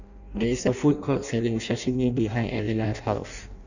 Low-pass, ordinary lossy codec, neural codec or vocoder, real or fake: 7.2 kHz; none; codec, 16 kHz in and 24 kHz out, 0.6 kbps, FireRedTTS-2 codec; fake